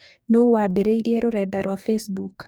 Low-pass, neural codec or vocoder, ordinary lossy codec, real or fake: none; codec, 44.1 kHz, 2.6 kbps, DAC; none; fake